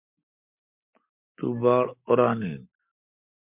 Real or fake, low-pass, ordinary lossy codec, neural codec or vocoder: real; 3.6 kHz; MP3, 32 kbps; none